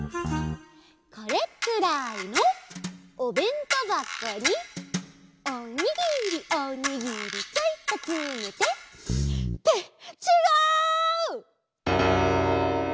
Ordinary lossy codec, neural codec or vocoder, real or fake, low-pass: none; none; real; none